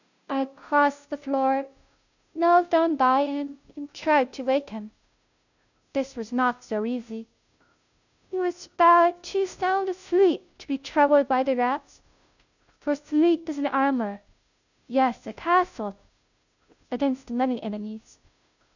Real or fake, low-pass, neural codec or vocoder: fake; 7.2 kHz; codec, 16 kHz, 0.5 kbps, FunCodec, trained on Chinese and English, 25 frames a second